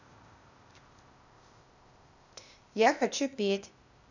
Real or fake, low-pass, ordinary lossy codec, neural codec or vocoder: fake; 7.2 kHz; none; codec, 16 kHz, 0.8 kbps, ZipCodec